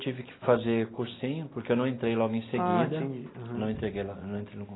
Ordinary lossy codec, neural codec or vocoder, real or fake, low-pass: AAC, 16 kbps; none; real; 7.2 kHz